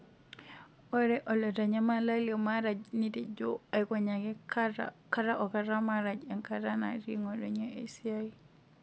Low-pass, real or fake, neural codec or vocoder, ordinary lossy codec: none; real; none; none